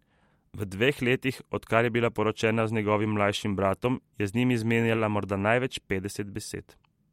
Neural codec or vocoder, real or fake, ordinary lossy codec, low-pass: none; real; MP3, 64 kbps; 19.8 kHz